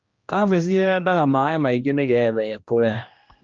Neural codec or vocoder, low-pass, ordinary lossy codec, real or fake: codec, 16 kHz, 1 kbps, X-Codec, HuBERT features, trained on general audio; 7.2 kHz; Opus, 24 kbps; fake